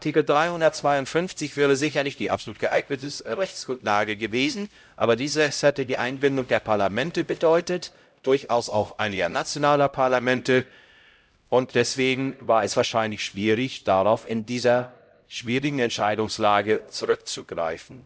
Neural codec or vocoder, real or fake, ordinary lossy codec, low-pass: codec, 16 kHz, 0.5 kbps, X-Codec, HuBERT features, trained on LibriSpeech; fake; none; none